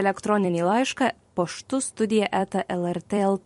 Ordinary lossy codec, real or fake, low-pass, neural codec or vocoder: MP3, 48 kbps; fake; 14.4 kHz; vocoder, 44.1 kHz, 128 mel bands every 256 samples, BigVGAN v2